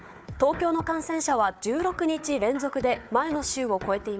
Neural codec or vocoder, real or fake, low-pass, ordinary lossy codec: codec, 16 kHz, 4 kbps, FunCodec, trained on Chinese and English, 50 frames a second; fake; none; none